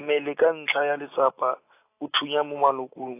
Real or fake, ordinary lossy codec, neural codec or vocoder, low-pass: real; AAC, 24 kbps; none; 3.6 kHz